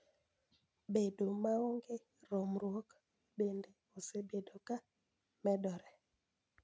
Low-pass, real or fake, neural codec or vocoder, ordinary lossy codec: none; real; none; none